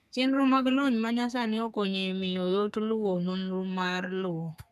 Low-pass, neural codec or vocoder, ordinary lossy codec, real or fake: 14.4 kHz; codec, 32 kHz, 1.9 kbps, SNAC; none; fake